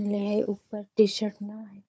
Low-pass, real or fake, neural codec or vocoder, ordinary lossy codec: none; fake; codec, 16 kHz, 4 kbps, FunCodec, trained on Chinese and English, 50 frames a second; none